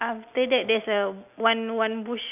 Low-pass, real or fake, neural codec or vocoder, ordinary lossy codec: 3.6 kHz; real; none; none